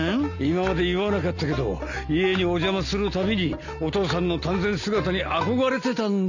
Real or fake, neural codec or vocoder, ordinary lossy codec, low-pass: real; none; none; 7.2 kHz